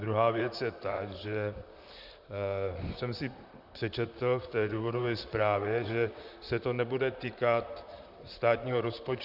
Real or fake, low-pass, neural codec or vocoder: fake; 5.4 kHz; vocoder, 44.1 kHz, 128 mel bands, Pupu-Vocoder